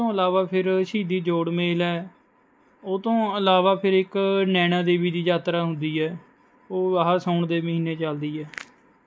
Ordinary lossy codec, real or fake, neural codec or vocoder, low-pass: none; real; none; none